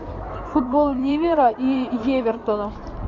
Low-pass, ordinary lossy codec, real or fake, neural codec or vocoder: 7.2 kHz; MP3, 48 kbps; fake; codec, 16 kHz in and 24 kHz out, 2.2 kbps, FireRedTTS-2 codec